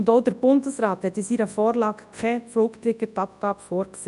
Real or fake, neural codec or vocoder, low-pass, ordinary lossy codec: fake; codec, 24 kHz, 0.9 kbps, WavTokenizer, large speech release; 10.8 kHz; none